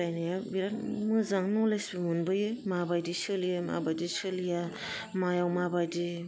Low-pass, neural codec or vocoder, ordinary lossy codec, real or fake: none; none; none; real